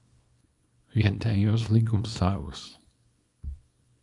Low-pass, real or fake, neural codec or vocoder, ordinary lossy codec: 10.8 kHz; fake; codec, 24 kHz, 0.9 kbps, WavTokenizer, small release; MP3, 96 kbps